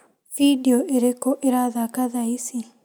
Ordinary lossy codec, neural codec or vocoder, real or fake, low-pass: none; none; real; none